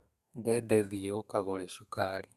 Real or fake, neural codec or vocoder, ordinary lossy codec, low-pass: fake; codec, 32 kHz, 1.9 kbps, SNAC; Opus, 64 kbps; 14.4 kHz